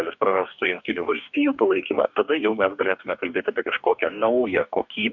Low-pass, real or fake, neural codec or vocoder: 7.2 kHz; fake; codec, 44.1 kHz, 2.6 kbps, DAC